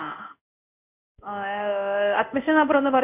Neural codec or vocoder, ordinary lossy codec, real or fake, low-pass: codec, 16 kHz in and 24 kHz out, 1 kbps, XY-Tokenizer; none; fake; 3.6 kHz